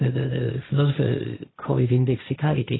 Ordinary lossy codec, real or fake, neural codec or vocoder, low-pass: AAC, 16 kbps; fake; codec, 16 kHz, 1.1 kbps, Voila-Tokenizer; 7.2 kHz